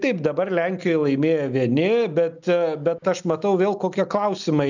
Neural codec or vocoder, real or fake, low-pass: none; real; 7.2 kHz